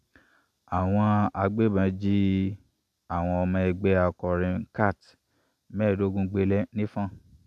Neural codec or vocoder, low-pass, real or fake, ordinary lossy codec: none; 14.4 kHz; real; none